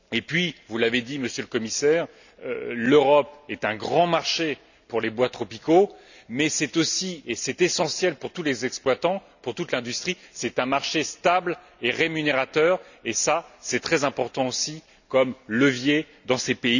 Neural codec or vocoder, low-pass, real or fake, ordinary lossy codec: none; 7.2 kHz; real; none